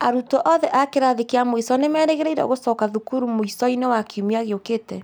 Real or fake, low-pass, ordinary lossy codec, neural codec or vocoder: real; none; none; none